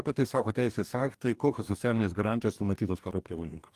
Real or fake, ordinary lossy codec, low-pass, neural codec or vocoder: fake; Opus, 32 kbps; 14.4 kHz; codec, 44.1 kHz, 2.6 kbps, DAC